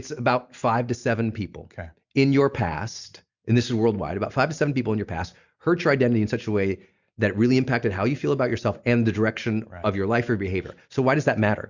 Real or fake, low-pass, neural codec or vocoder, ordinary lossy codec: real; 7.2 kHz; none; Opus, 64 kbps